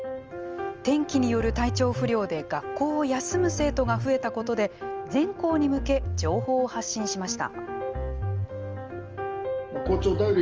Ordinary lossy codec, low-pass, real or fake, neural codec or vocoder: Opus, 24 kbps; 7.2 kHz; real; none